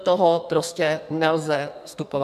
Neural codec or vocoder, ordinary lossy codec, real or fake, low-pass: codec, 44.1 kHz, 2.6 kbps, SNAC; AAC, 96 kbps; fake; 14.4 kHz